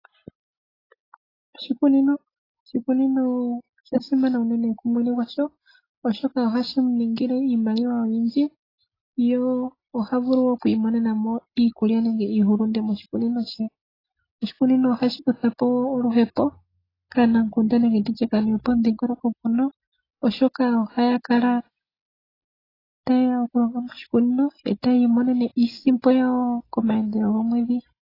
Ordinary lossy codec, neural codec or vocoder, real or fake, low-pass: AAC, 24 kbps; none; real; 5.4 kHz